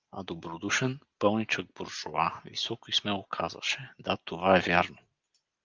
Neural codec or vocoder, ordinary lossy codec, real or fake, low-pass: none; Opus, 32 kbps; real; 7.2 kHz